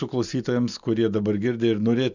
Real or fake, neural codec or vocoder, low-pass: real; none; 7.2 kHz